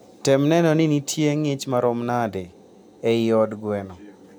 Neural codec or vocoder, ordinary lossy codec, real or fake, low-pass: none; none; real; none